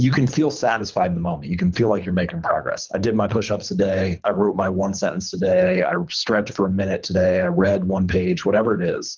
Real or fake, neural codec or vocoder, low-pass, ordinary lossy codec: fake; codec, 24 kHz, 3 kbps, HILCodec; 7.2 kHz; Opus, 24 kbps